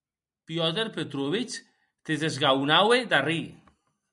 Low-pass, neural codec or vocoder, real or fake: 10.8 kHz; none; real